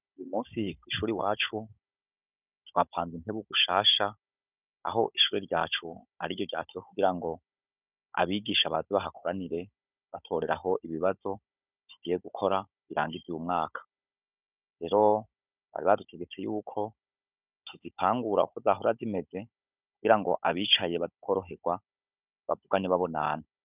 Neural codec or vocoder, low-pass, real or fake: codec, 16 kHz, 16 kbps, FunCodec, trained on Chinese and English, 50 frames a second; 3.6 kHz; fake